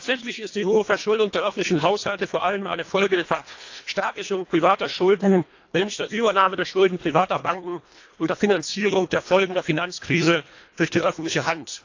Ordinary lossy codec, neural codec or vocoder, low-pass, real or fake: AAC, 48 kbps; codec, 24 kHz, 1.5 kbps, HILCodec; 7.2 kHz; fake